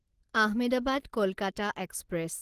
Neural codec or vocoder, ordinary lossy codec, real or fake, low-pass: none; Opus, 16 kbps; real; 14.4 kHz